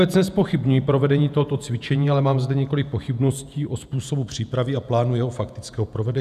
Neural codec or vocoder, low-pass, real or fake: vocoder, 48 kHz, 128 mel bands, Vocos; 14.4 kHz; fake